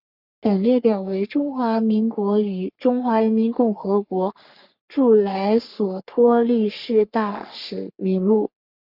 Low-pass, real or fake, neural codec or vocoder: 5.4 kHz; fake; codec, 44.1 kHz, 3.4 kbps, Pupu-Codec